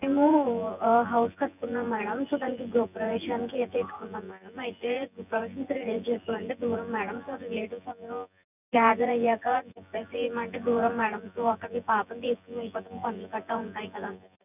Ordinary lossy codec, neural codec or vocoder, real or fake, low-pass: none; vocoder, 24 kHz, 100 mel bands, Vocos; fake; 3.6 kHz